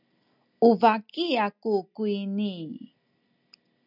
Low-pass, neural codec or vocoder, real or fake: 5.4 kHz; none; real